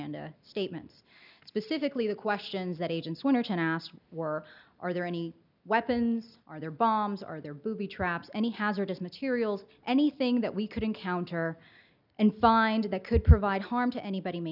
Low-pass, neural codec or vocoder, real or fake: 5.4 kHz; none; real